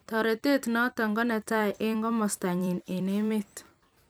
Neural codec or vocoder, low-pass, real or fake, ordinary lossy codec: vocoder, 44.1 kHz, 128 mel bands every 256 samples, BigVGAN v2; none; fake; none